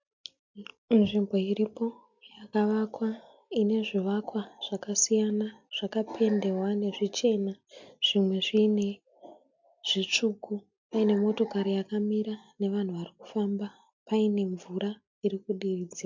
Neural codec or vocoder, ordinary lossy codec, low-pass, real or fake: none; MP3, 64 kbps; 7.2 kHz; real